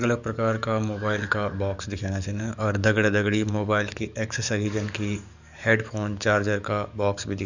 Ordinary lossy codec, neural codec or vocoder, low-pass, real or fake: none; codec, 44.1 kHz, 7.8 kbps, DAC; 7.2 kHz; fake